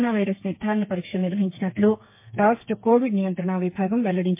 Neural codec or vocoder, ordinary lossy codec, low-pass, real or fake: codec, 32 kHz, 1.9 kbps, SNAC; MP3, 24 kbps; 3.6 kHz; fake